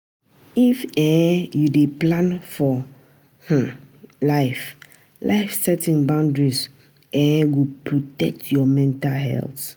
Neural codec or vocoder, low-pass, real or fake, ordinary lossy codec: none; none; real; none